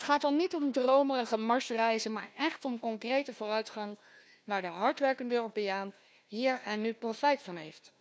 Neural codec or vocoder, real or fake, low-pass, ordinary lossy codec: codec, 16 kHz, 1 kbps, FunCodec, trained on Chinese and English, 50 frames a second; fake; none; none